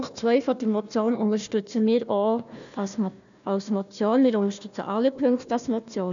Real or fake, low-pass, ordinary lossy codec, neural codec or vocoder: fake; 7.2 kHz; none; codec, 16 kHz, 1 kbps, FunCodec, trained on Chinese and English, 50 frames a second